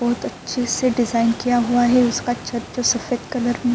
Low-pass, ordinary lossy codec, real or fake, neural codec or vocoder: none; none; real; none